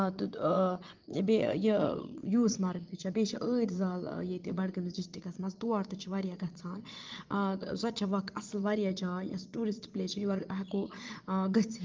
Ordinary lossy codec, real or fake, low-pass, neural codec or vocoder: Opus, 32 kbps; fake; 7.2 kHz; vocoder, 22.05 kHz, 80 mel bands, Vocos